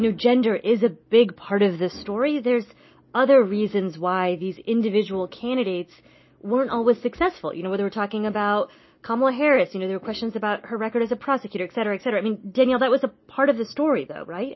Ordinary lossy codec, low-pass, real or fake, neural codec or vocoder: MP3, 24 kbps; 7.2 kHz; real; none